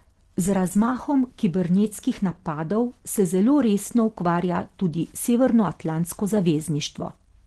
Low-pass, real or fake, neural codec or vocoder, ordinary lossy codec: 10.8 kHz; real; none; Opus, 16 kbps